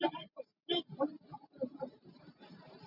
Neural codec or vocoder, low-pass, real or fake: none; 5.4 kHz; real